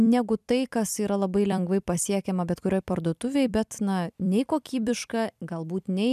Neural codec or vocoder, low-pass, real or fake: vocoder, 44.1 kHz, 128 mel bands every 256 samples, BigVGAN v2; 14.4 kHz; fake